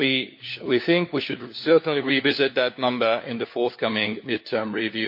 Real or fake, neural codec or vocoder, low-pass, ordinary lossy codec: fake; codec, 16 kHz, 4 kbps, FunCodec, trained on LibriTTS, 50 frames a second; 5.4 kHz; MP3, 32 kbps